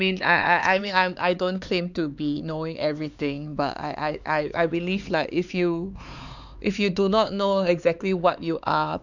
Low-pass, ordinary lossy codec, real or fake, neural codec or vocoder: 7.2 kHz; none; fake; codec, 16 kHz, 4 kbps, X-Codec, HuBERT features, trained on LibriSpeech